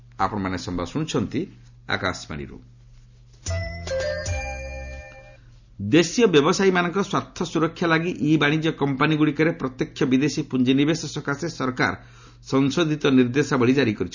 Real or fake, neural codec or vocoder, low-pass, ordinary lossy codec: real; none; 7.2 kHz; none